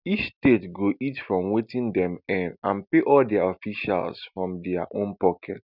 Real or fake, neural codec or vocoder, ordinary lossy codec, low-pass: real; none; none; 5.4 kHz